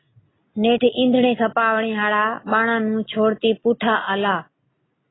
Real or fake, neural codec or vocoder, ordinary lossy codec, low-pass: real; none; AAC, 16 kbps; 7.2 kHz